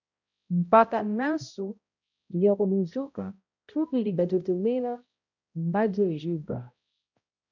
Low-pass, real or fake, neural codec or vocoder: 7.2 kHz; fake; codec, 16 kHz, 0.5 kbps, X-Codec, HuBERT features, trained on balanced general audio